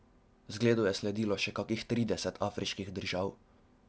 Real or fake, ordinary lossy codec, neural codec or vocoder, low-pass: real; none; none; none